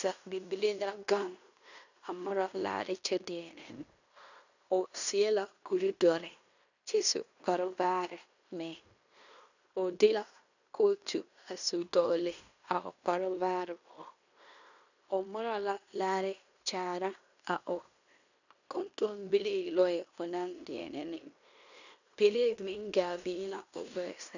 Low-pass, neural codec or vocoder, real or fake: 7.2 kHz; codec, 16 kHz in and 24 kHz out, 0.9 kbps, LongCat-Audio-Codec, fine tuned four codebook decoder; fake